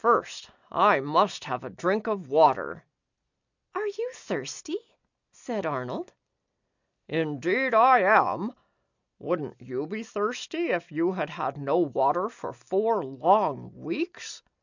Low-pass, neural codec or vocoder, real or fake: 7.2 kHz; none; real